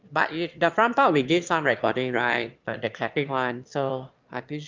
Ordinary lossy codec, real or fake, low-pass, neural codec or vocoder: Opus, 32 kbps; fake; 7.2 kHz; autoencoder, 22.05 kHz, a latent of 192 numbers a frame, VITS, trained on one speaker